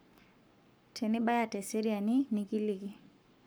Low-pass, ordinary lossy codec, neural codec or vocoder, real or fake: none; none; codec, 44.1 kHz, 7.8 kbps, Pupu-Codec; fake